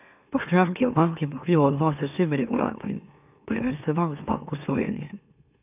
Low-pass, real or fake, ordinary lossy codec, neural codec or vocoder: 3.6 kHz; fake; none; autoencoder, 44.1 kHz, a latent of 192 numbers a frame, MeloTTS